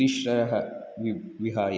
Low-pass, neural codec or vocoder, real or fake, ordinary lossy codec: none; none; real; none